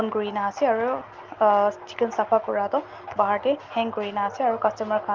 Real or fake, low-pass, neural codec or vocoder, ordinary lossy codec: real; 7.2 kHz; none; Opus, 24 kbps